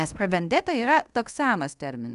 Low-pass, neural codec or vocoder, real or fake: 10.8 kHz; codec, 24 kHz, 0.5 kbps, DualCodec; fake